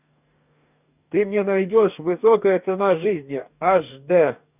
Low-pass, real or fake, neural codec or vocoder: 3.6 kHz; fake; codec, 44.1 kHz, 2.6 kbps, DAC